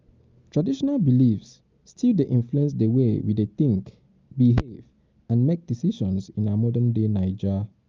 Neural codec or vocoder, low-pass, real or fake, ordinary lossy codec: none; 7.2 kHz; real; Opus, 32 kbps